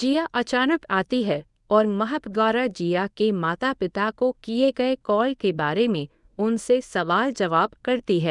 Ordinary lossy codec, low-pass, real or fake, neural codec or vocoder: none; 10.8 kHz; fake; codec, 24 kHz, 0.9 kbps, WavTokenizer, medium speech release version 1